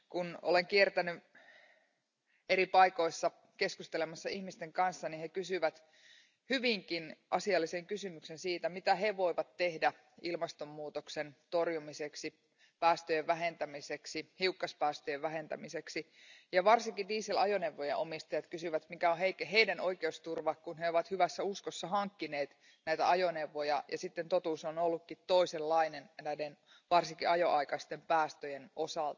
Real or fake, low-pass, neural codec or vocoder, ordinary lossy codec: real; 7.2 kHz; none; none